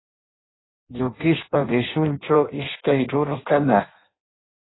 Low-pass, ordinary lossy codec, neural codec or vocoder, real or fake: 7.2 kHz; AAC, 16 kbps; codec, 16 kHz in and 24 kHz out, 0.6 kbps, FireRedTTS-2 codec; fake